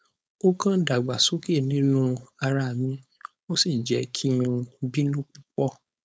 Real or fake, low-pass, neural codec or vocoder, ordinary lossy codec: fake; none; codec, 16 kHz, 4.8 kbps, FACodec; none